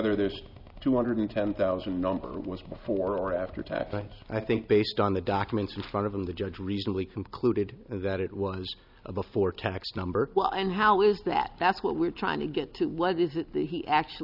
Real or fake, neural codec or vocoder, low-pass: real; none; 5.4 kHz